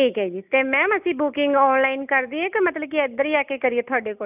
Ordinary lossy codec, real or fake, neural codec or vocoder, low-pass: none; real; none; 3.6 kHz